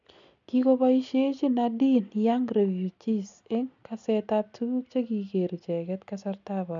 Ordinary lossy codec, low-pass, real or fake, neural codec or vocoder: none; 7.2 kHz; real; none